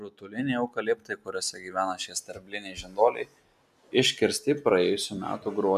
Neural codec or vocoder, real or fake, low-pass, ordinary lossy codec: none; real; 14.4 kHz; MP3, 96 kbps